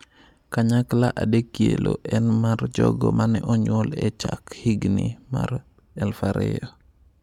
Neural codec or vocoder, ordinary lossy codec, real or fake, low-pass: none; MP3, 96 kbps; real; 19.8 kHz